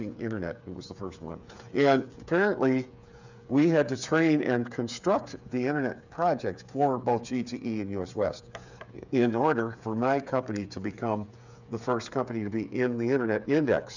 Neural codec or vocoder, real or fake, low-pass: codec, 16 kHz, 8 kbps, FreqCodec, smaller model; fake; 7.2 kHz